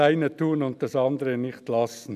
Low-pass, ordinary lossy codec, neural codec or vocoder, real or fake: 14.4 kHz; none; none; real